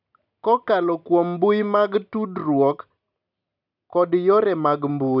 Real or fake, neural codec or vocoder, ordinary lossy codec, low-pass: real; none; none; 5.4 kHz